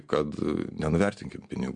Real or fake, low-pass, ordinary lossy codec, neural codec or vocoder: real; 9.9 kHz; MP3, 64 kbps; none